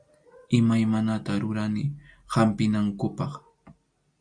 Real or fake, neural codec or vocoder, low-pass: real; none; 9.9 kHz